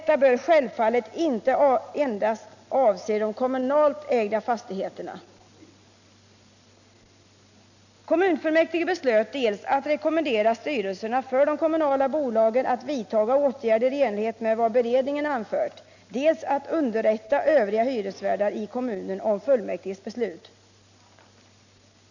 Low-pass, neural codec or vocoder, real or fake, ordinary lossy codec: 7.2 kHz; none; real; none